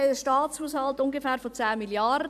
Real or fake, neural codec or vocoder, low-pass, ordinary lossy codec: fake; vocoder, 44.1 kHz, 128 mel bands every 256 samples, BigVGAN v2; 14.4 kHz; AAC, 96 kbps